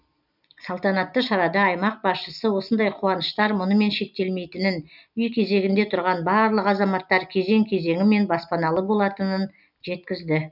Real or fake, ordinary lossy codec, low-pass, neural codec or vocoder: real; none; 5.4 kHz; none